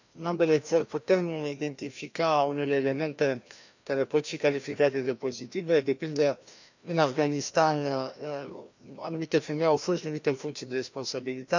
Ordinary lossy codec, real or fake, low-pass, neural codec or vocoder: none; fake; 7.2 kHz; codec, 16 kHz, 1 kbps, FreqCodec, larger model